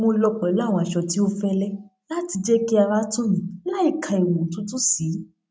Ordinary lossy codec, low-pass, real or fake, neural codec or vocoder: none; none; real; none